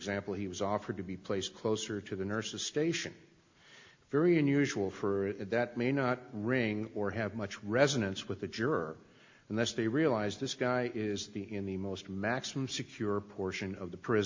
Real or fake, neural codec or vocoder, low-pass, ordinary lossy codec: real; none; 7.2 kHz; MP3, 48 kbps